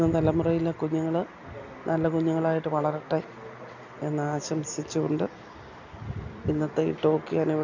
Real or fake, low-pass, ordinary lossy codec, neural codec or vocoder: real; 7.2 kHz; none; none